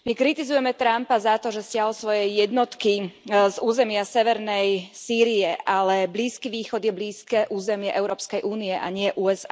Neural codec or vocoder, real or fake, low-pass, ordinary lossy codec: none; real; none; none